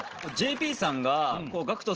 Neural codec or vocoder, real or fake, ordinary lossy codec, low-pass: none; real; Opus, 16 kbps; 7.2 kHz